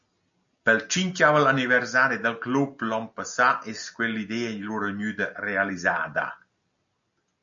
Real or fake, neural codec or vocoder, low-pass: real; none; 7.2 kHz